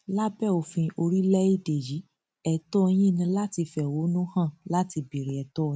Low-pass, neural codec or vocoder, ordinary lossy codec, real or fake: none; none; none; real